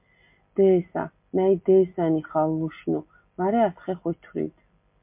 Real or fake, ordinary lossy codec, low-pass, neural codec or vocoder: real; MP3, 32 kbps; 3.6 kHz; none